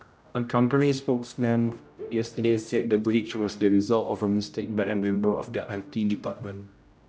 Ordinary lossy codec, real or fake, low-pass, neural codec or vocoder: none; fake; none; codec, 16 kHz, 0.5 kbps, X-Codec, HuBERT features, trained on general audio